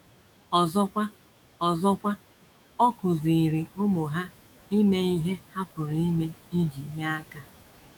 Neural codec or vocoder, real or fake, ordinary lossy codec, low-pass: autoencoder, 48 kHz, 128 numbers a frame, DAC-VAE, trained on Japanese speech; fake; none; 19.8 kHz